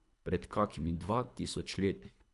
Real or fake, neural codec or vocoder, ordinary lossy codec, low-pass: fake; codec, 24 kHz, 3 kbps, HILCodec; none; 10.8 kHz